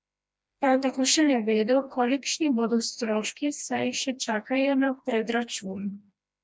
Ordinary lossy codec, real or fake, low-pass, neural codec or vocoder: none; fake; none; codec, 16 kHz, 1 kbps, FreqCodec, smaller model